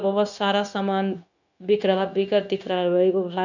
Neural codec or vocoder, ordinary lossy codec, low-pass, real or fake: codec, 16 kHz, 0.9 kbps, LongCat-Audio-Codec; none; 7.2 kHz; fake